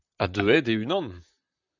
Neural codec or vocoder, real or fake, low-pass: vocoder, 44.1 kHz, 128 mel bands, Pupu-Vocoder; fake; 7.2 kHz